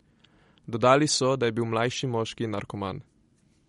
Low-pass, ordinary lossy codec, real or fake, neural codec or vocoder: 19.8 kHz; MP3, 48 kbps; fake; vocoder, 44.1 kHz, 128 mel bands every 256 samples, BigVGAN v2